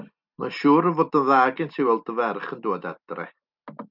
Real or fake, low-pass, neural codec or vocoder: real; 5.4 kHz; none